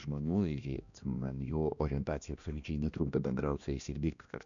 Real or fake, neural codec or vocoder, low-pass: fake; codec, 16 kHz, 1 kbps, X-Codec, HuBERT features, trained on balanced general audio; 7.2 kHz